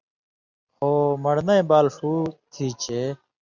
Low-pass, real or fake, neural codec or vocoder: 7.2 kHz; real; none